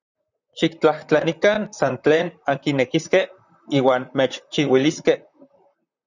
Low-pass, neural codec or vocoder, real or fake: 7.2 kHz; vocoder, 44.1 kHz, 128 mel bands, Pupu-Vocoder; fake